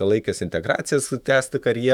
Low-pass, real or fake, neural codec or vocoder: 19.8 kHz; fake; autoencoder, 48 kHz, 128 numbers a frame, DAC-VAE, trained on Japanese speech